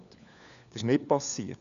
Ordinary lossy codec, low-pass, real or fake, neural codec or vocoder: none; 7.2 kHz; fake; codec, 16 kHz, 4 kbps, FunCodec, trained on LibriTTS, 50 frames a second